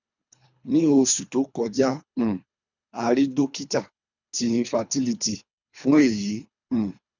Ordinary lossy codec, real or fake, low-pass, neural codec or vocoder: none; fake; 7.2 kHz; codec, 24 kHz, 3 kbps, HILCodec